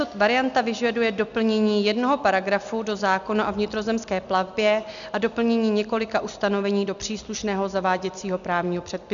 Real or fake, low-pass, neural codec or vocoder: real; 7.2 kHz; none